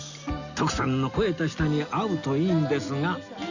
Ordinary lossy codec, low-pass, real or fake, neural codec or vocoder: Opus, 64 kbps; 7.2 kHz; real; none